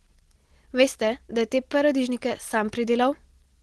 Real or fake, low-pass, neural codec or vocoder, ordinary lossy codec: real; 10.8 kHz; none; Opus, 16 kbps